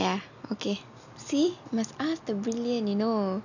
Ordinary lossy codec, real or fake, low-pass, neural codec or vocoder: none; real; 7.2 kHz; none